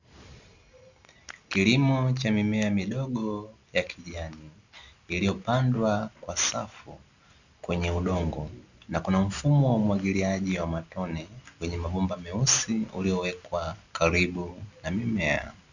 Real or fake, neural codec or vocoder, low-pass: real; none; 7.2 kHz